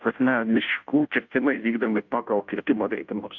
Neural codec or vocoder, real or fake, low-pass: codec, 16 kHz, 0.5 kbps, FunCodec, trained on Chinese and English, 25 frames a second; fake; 7.2 kHz